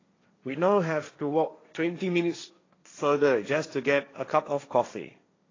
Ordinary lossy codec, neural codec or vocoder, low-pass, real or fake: AAC, 32 kbps; codec, 16 kHz, 1.1 kbps, Voila-Tokenizer; 7.2 kHz; fake